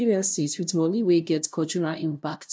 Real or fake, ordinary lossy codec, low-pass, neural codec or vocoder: fake; none; none; codec, 16 kHz, 0.5 kbps, FunCodec, trained on LibriTTS, 25 frames a second